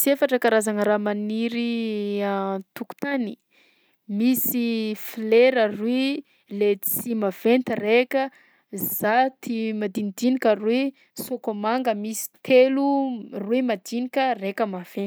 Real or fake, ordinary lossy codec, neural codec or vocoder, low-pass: real; none; none; none